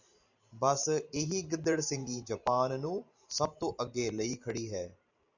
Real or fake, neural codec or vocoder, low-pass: fake; vocoder, 44.1 kHz, 128 mel bands every 512 samples, BigVGAN v2; 7.2 kHz